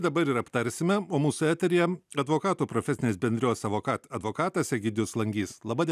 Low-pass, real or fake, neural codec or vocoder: 14.4 kHz; real; none